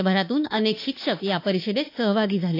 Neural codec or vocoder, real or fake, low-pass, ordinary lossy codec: autoencoder, 48 kHz, 32 numbers a frame, DAC-VAE, trained on Japanese speech; fake; 5.4 kHz; AAC, 32 kbps